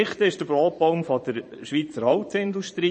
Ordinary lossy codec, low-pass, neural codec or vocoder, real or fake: MP3, 32 kbps; 9.9 kHz; vocoder, 22.05 kHz, 80 mel bands, Vocos; fake